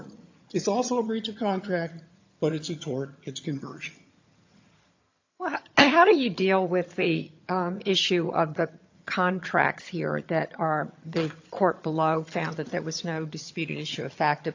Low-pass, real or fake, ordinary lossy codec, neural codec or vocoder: 7.2 kHz; fake; AAC, 48 kbps; vocoder, 22.05 kHz, 80 mel bands, HiFi-GAN